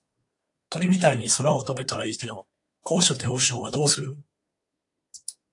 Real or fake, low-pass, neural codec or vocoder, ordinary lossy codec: fake; 10.8 kHz; codec, 24 kHz, 1 kbps, SNAC; AAC, 64 kbps